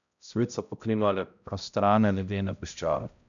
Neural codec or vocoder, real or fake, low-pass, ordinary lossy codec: codec, 16 kHz, 0.5 kbps, X-Codec, HuBERT features, trained on balanced general audio; fake; 7.2 kHz; none